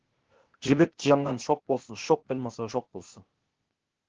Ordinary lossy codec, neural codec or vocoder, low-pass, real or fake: Opus, 16 kbps; codec, 16 kHz, 0.8 kbps, ZipCodec; 7.2 kHz; fake